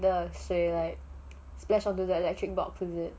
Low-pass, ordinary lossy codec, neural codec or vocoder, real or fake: none; none; none; real